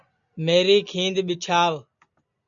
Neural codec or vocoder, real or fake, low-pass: none; real; 7.2 kHz